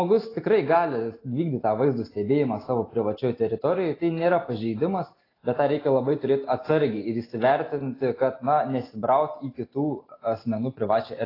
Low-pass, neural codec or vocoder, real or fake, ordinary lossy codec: 5.4 kHz; none; real; AAC, 24 kbps